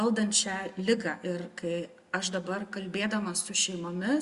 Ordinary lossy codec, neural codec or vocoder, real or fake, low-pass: Opus, 64 kbps; none; real; 10.8 kHz